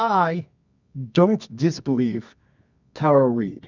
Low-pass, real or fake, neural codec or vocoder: 7.2 kHz; fake; codec, 24 kHz, 0.9 kbps, WavTokenizer, medium music audio release